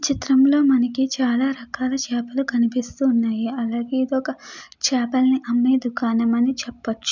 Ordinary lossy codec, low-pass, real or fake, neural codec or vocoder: none; 7.2 kHz; real; none